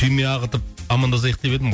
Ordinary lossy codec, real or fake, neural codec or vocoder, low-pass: none; real; none; none